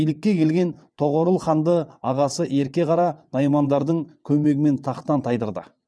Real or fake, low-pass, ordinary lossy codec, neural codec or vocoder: fake; none; none; vocoder, 22.05 kHz, 80 mel bands, WaveNeXt